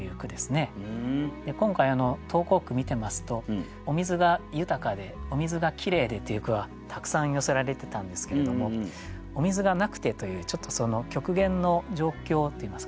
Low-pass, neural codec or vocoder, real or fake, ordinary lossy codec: none; none; real; none